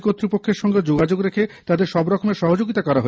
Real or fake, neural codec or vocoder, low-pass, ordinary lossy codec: real; none; none; none